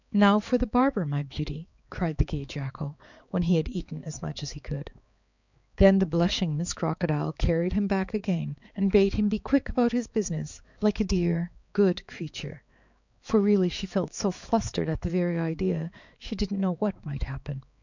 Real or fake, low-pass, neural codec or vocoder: fake; 7.2 kHz; codec, 16 kHz, 4 kbps, X-Codec, HuBERT features, trained on balanced general audio